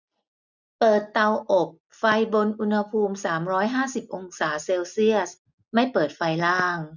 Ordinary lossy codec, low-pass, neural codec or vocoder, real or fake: none; 7.2 kHz; none; real